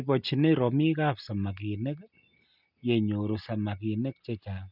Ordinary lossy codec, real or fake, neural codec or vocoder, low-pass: none; real; none; 5.4 kHz